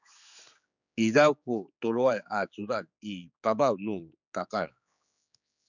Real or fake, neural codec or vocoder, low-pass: fake; codec, 16 kHz, 4 kbps, X-Codec, HuBERT features, trained on general audio; 7.2 kHz